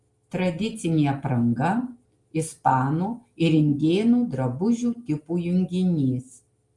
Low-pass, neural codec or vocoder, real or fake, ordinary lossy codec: 10.8 kHz; none; real; Opus, 32 kbps